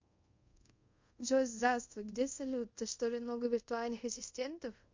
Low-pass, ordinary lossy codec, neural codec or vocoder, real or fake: 7.2 kHz; MP3, 48 kbps; codec, 24 kHz, 0.5 kbps, DualCodec; fake